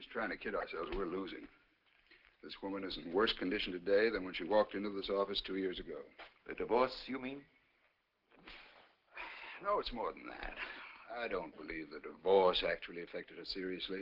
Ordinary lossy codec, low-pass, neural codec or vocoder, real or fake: Opus, 16 kbps; 5.4 kHz; none; real